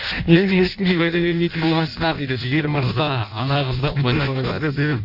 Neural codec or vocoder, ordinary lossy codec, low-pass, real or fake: codec, 16 kHz in and 24 kHz out, 0.6 kbps, FireRedTTS-2 codec; none; 5.4 kHz; fake